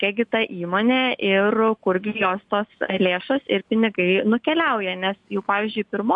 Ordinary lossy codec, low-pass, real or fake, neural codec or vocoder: MP3, 64 kbps; 10.8 kHz; real; none